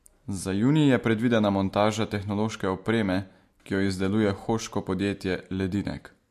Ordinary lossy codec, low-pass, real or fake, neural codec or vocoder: MP3, 64 kbps; 14.4 kHz; real; none